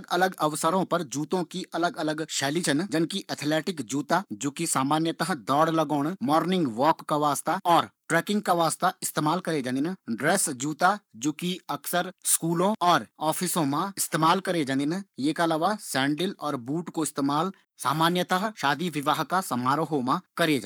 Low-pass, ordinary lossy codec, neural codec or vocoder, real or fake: none; none; codec, 44.1 kHz, 7.8 kbps, Pupu-Codec; fake